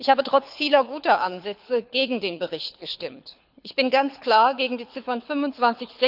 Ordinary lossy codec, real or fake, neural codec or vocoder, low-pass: none; fake; codec, 24 kHz, 6 kbps, HILCodec; 5.4 kHz